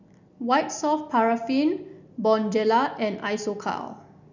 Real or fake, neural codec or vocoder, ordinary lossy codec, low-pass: real; none; none; 7.2 kHz